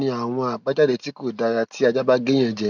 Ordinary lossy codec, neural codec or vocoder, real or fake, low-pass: none; none; real; 7.2 kHz